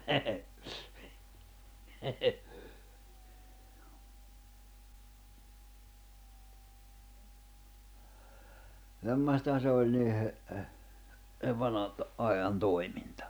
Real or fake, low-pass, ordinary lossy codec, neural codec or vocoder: real; none; none; none